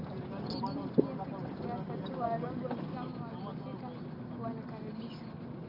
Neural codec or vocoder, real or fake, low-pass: none; real; 5.4 kHz